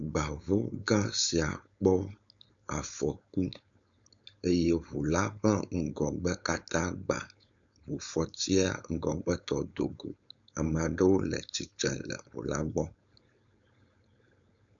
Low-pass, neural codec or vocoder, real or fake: 7.2 kHz; codec, 16 kHz, 4.8 kbps, FACodec; fake